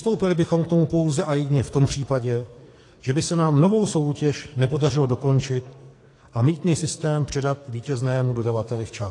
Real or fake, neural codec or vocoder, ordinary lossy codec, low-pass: fake; codec, 44.1 kHz, 2.6 kbps, SNAC; AAC, 48 kbps; 10.8 kHz